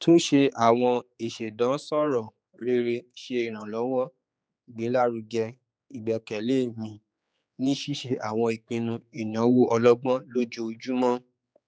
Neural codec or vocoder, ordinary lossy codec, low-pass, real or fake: codec, 16 kHz, 4 kbps, X-Codec, HuBERT features, trained on general audio; none; none; fake